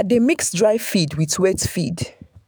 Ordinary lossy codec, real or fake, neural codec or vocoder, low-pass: none; fake; autoencoder, 48 kHz, 128 numbers a frame, DAC-VAE, trained on Japanese speech; none